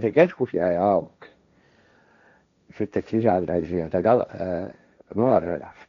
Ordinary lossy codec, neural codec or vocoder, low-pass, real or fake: MP3, 64 kbps; codec, 16 kHz, 1.1 kbps, Voila-Tokenizer; 7.2 kHz; fake